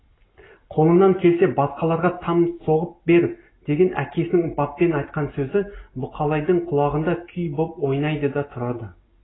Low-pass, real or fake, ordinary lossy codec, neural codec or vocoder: 7.2 kHz; real; AAC, 16 kbps; none